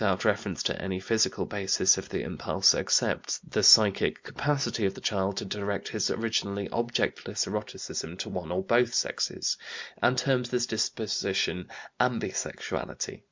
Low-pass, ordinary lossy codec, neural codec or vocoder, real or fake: 7.2 kHz; MP3, 64 kbps; none; real